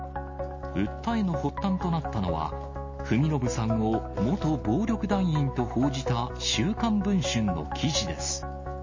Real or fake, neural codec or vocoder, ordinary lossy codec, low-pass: real; none; MP3, 32 kbps; 7.2 kHz